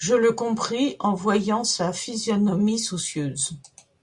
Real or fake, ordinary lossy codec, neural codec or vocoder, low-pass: real; Opus, 64 kbps; none; 10.8 kHz